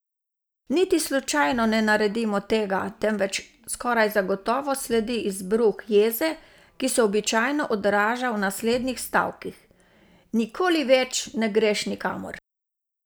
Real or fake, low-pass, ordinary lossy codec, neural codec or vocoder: real; none; none; none